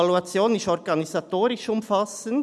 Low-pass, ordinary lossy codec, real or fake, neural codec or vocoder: none; none; real; none